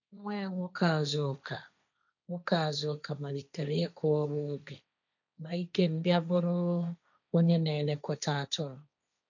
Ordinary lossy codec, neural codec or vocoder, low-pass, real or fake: none; codec, 16 kHz, 1.1 kbps, Voila-Tokenizer; 7.2 kHz; fake